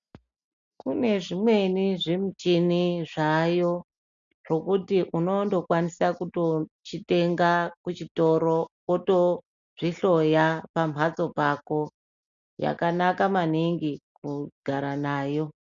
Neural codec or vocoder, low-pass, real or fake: none; 7.2 kHz; real